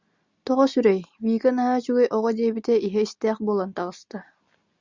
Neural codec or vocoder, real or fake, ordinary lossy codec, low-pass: none; real; Opus, 64 kbps; 7.2 kHz